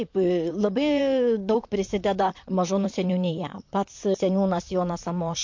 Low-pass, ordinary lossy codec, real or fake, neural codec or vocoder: 7.2 kHz; MP3, 48 kbps; fake; vocoder, 44.1 kHz, 128 mel bands, Pupu-Vocoder